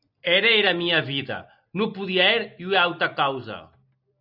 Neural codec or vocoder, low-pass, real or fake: none; 5.4 kHz; real